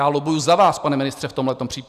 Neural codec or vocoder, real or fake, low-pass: none; real; 14.4 kHz